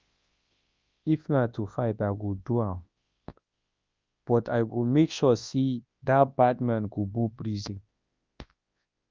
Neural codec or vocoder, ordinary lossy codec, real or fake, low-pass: codec, 24 kHz, 0.9 kbps, WavTokenizer, large speech release; Opus, 24 kbps; fake; 7.2 kHz